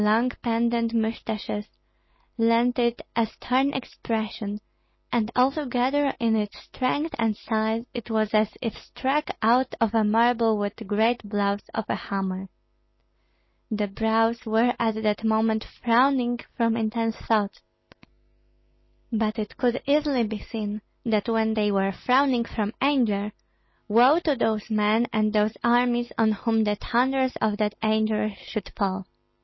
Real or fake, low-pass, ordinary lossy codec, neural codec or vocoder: real; 7.2 kHz; MP3, 24 kbps; none